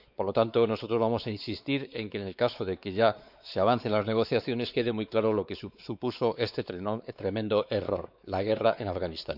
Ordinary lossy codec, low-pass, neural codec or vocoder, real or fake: none; 5.4 kHz; codec, 16 kHz, 4 kbps, X-Codec, WavLM features, trained on Multilingual LibriSpeech; fake